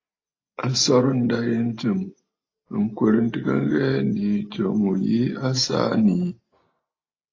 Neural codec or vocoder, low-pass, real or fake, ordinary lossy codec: none; 7.2 kHz; real; AAC, 32 kbps